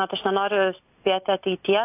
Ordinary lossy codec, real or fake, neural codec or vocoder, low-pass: AAC, 32 kbps; real; none; 3.6 kHz